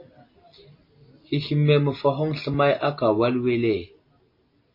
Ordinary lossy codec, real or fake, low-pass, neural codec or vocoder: MP3, 24 kbps; real; 5.4 kHz; none